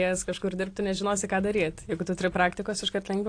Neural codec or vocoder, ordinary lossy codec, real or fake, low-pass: none; AAC, 48 kbps; real; 9.9 kHz